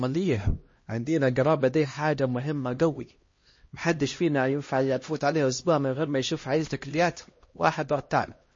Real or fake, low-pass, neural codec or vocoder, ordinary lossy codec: fake; 7.2 kHz; codec, 16 kHz, 1 kbps, X-Codec, HuBERT features, trained on LibriSpeech; MP3, 32 kbps